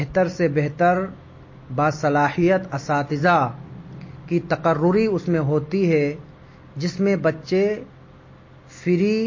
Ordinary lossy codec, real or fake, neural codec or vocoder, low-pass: MP3, 32 kbps; real; none; 7.2 kHz